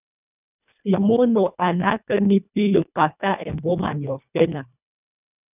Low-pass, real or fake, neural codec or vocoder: 3.6 kHz; fake; codec, 24 kHz, 1.5 kbps, HILCodec